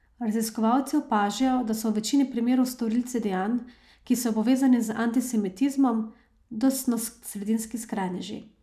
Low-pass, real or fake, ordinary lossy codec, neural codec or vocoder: 14.4 kHz; real; none; none